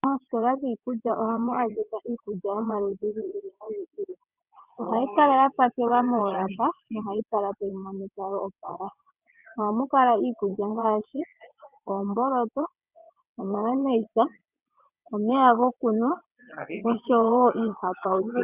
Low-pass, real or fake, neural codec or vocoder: 3.6 kHz; fake; vocoder, 22.05 kHz, 80 mel bands, WaveNeXt